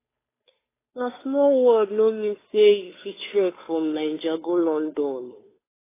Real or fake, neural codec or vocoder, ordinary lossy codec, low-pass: fake; codec, 16 kHz, 2 kbps, FunCodec, trained on Chinese and English, 25 frames a second; AAC, 16 kbps; 3.6 kHz